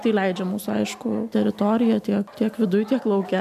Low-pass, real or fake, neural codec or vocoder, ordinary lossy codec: 14.4 kHz; real; none; MP3, 96 kbps